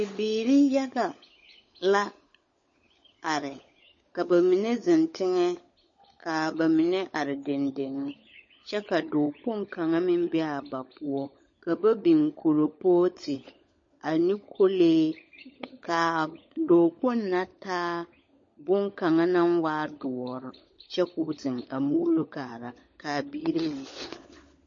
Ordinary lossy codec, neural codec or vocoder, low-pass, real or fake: MP3, 32 kbps; codec, 16 kHz, 16 kbps, FunCodec, trained on LibriTTS, 50 frames a second; 7.2 kHz; fake